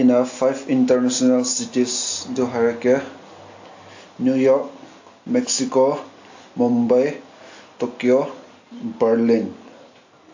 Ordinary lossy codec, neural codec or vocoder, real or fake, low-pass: AAC, 48 kbps; none; real; 7.2 kHz